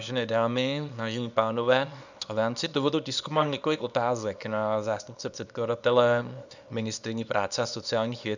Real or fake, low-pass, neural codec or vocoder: fake; 7.2 kHz; codec, 24 kHz, 0.9 kbps, WavTokenizer, small release